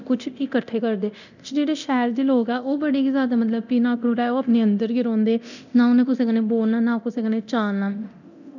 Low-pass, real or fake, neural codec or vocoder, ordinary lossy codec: 7.2 kHz; fake; codec, 24 kHz, 0.9 kbps, DualCodec; none